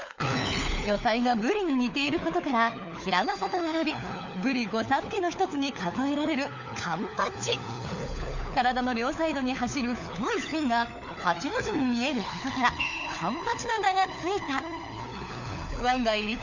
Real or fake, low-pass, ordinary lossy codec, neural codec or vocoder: fake; 7.2 kHz; none; codec, 16 kHz, 4 kbps, FunCodec, trained on LibriTTS, 50 frames a second